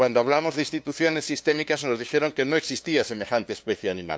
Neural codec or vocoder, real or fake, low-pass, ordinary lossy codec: codec, 16 kHz, 2 kbps, FunCodec, trained on LibriTTS, 25 frames a second; fake; none; none